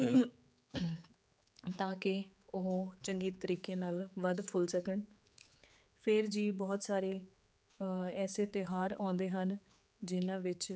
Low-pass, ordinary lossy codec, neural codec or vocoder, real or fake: none; none; codec, 16 kHz, 4 kbps, X-Codec, HuBERT features, trained on general audio; fake